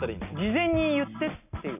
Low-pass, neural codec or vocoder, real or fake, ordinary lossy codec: 3.6 kHz; none; real; none